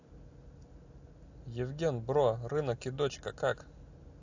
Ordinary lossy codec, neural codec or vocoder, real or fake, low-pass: AAC, 48 kbps; none; real; 7.2 kHz